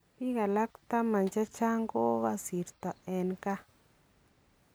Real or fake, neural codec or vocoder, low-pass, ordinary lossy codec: real; none; none; none